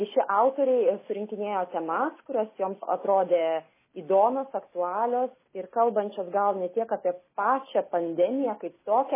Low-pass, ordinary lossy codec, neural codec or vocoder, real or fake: 3.6 kHz; MP3, 16 kbps; none; real